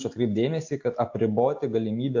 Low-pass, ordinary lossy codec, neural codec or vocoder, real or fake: 7.2 kHz; AAC, 48 kbps; none; real